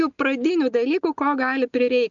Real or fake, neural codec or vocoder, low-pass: real; none; 7.2 kHz